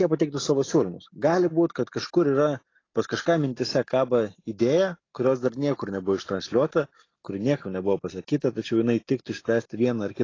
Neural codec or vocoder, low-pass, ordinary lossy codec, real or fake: none; 7.2 kHz; AAC, 32 kbps; real